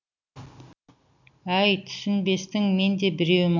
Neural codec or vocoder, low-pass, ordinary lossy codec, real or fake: none; 7.2 kHz; none; real